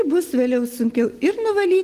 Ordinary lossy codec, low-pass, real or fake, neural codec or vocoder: Opus, 16 kbps; 14.4 kHz; real; none